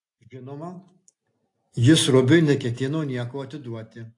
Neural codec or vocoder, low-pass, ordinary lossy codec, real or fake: none; 10.8 kHz; AAC, 48 kbps; real